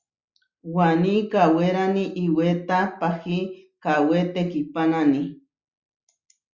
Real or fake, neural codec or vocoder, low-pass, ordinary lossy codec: real; none; 7.2 kHz; Opus, 64 kbps